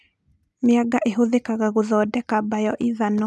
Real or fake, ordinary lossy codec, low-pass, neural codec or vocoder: real; none; none; none